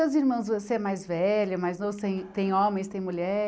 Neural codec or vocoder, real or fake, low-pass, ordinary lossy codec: none; real; none; none